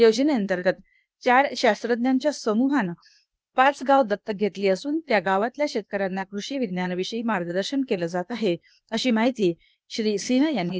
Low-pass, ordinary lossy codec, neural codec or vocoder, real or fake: none; none; codec, 16 kHz, 0.8 kbps, ZipCodec; fake